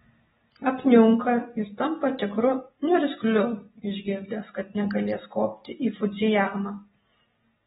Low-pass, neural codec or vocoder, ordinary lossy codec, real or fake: 7.2 kHz; none; AAC, 16 kbps; real